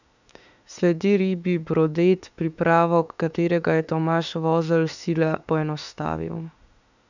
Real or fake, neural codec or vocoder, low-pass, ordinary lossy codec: fake; autoencoder, 48 kHz, 32 numbers a frame, DAC-VAE, trained on Japanese speech; 7.2 kHz; none